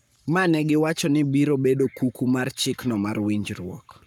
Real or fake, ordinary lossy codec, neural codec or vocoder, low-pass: fake; none; codec, 44.1 kHz, 7.8 kbps, Pupu-Codec; 19.8 kHz